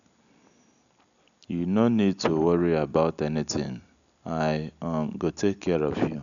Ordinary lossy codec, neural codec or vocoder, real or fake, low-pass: none; none; real; 7.2 kHz